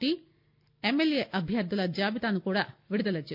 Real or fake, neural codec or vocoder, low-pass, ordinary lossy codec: real; none; 5.4 kHz; none